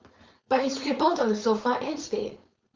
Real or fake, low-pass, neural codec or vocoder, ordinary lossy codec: fake; 7.2 kHz; codec, 16 kHz, 4.8 kbps, FACodec; Opus, 32 kbps